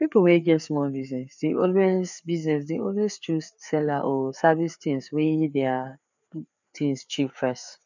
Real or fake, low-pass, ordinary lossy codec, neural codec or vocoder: fake; 7.2 kHz; none; codec, 16 kHz, 4 kbps, FreqCodec, larger model